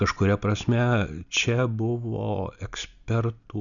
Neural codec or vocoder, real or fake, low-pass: none; real; 7.2 kHz